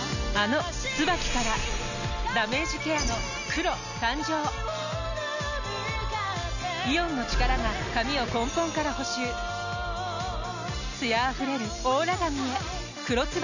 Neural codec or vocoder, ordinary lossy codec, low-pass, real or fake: none; none; 7.2 kHz; real